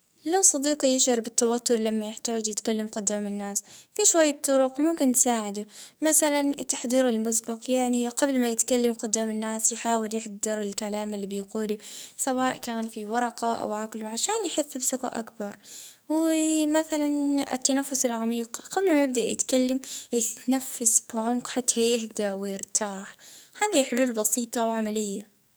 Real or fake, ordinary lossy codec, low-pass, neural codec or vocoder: fake; none; none; codec, 44.1 kHz, 2.6 kbps, SNAC